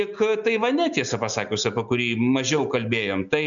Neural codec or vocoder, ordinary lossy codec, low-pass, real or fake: none; MP3, 96 kbps; 7.2 kHz; real